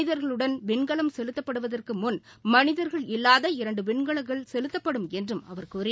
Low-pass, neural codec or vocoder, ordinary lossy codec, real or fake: none; none; none; real